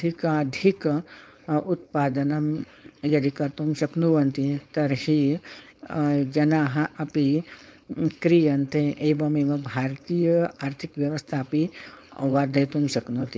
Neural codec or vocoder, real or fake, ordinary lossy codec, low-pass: codec, 16 kHz, 4.8 kbps, FACodec; fake; none; none